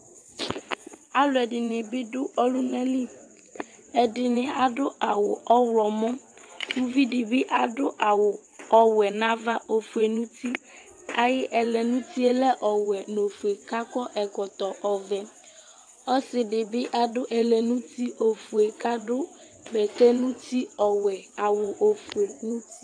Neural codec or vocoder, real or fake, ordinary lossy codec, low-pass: vocoder, 22.05 kHz, 80 mel bands, WaveNeXt; fake; AAC, 64 kbps; 9.9 kHz